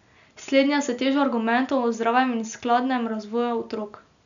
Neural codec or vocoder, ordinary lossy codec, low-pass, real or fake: none; none; 7.2 kHz; real